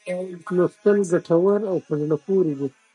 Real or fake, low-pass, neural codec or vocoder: real; 10.8 kHz; none